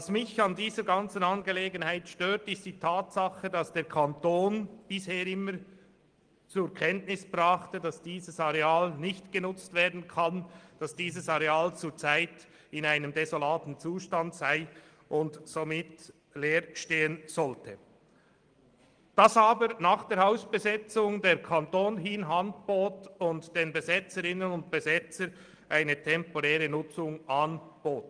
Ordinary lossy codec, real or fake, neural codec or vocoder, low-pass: Opus, 24 kbps; real; none; 9.9 kHz